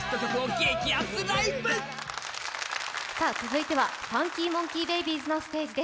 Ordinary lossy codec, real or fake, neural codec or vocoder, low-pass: none; real; none; none